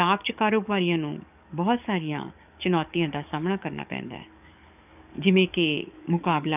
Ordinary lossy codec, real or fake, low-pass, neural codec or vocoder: none; fake; 3.6 kHz; codec, 44.1 kHz, 7.8 kbps, DAC